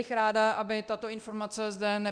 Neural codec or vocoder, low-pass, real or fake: codec, 24 kHz, 0.9 kbps, DualCodec; 9.9 kHz; fake